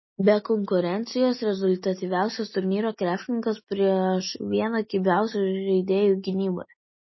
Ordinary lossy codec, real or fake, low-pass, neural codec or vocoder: MP3, 24 kbps; real; 7.2 kHz; none